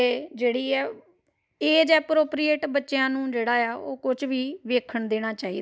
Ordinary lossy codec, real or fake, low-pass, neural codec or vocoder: none; real; none; none